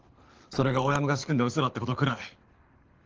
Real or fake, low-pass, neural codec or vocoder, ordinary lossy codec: fake; 7.2 kHz; codec, 24 kHz, 6 kbps, HILCodec; Opus, 16 kbps